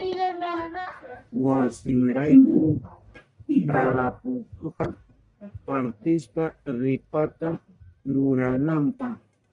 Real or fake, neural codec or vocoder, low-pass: fake; codec, 44.1 kHz, 1.7 kbps, Pupu-Codec; 10.8 kHz